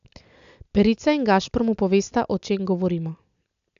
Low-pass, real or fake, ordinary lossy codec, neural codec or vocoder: 7.2 kHz; real; AAC, 96 kbps; none